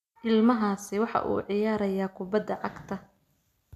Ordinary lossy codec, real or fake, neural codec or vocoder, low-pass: none; real; none; 14.4 kHz